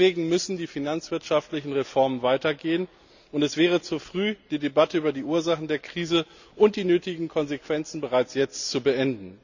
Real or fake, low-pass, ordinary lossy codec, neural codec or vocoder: real; 7.2 kHz; none; none